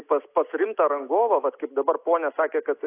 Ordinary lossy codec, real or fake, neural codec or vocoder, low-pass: AAC, 24 kbps; real; none; 3.6 kHz